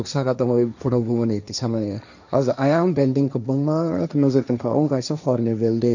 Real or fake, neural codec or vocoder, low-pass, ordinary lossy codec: fake; codec, 16 kHz, 1.1 kbps, Voila-Tokenizer; 7.2 kHz; none